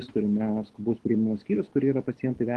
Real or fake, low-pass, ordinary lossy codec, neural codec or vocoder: real; 10.8 kHz; Opus, 16 kbps; none